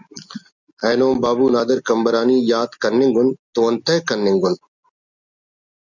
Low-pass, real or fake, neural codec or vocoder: 7.2 kHz; real; none